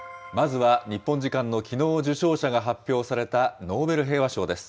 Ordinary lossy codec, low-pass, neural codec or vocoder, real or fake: none; none; none; real